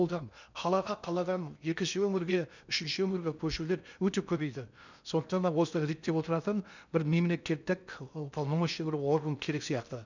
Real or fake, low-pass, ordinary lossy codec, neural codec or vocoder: fake; 7.2 kHz; none; codec, 16 kHz in and 24 kHz out, 0.8 kbps, FocalCodec, streaming, 65536 codes